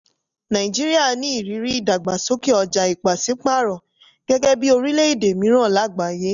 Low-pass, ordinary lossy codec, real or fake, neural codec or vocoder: 7.2 kHz; none; real; none